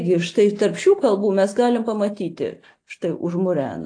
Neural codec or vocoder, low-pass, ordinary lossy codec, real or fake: none; 9.9 kHz; AAC, 48 kbps; real